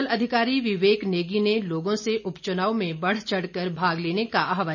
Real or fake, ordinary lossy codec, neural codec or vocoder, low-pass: real; none; none; 7.2 kHz